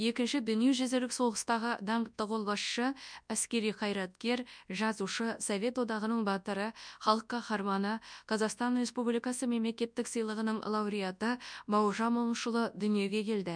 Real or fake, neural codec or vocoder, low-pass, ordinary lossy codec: fake; codec, 24 kHz, 0.9 kbps, WavTokenizer, large speech release; 9.9 kHz; none